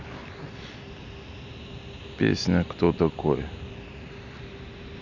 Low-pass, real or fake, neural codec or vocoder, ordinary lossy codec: 7.2 kHz; real; none; none